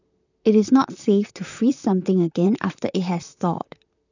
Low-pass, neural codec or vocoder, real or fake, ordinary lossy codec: 7.2 kHz; vocoder, 44.1 kHz, 128 mel bands, Pupu-Vocoder; fake; none